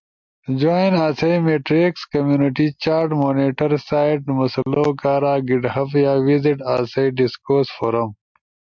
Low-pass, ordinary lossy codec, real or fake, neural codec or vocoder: 7.2 kHz; MP3, 64 kbps; real; none